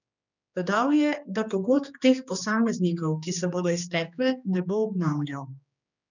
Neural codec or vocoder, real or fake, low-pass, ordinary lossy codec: codec, 16 kHz, 2 kbps, X-Codec, HuBERT features, trained on general audio; fake; 7.2 kHz; none